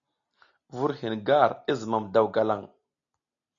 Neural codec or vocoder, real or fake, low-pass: none; real; 7.2 kHz